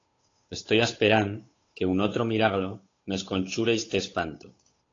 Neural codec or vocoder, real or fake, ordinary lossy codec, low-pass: codec, 16 kHz, 8 kbps, FunCodec, trained on Chinese and English, 25 frames a second; fake; AAC, 32 kbps; 7.2 kHz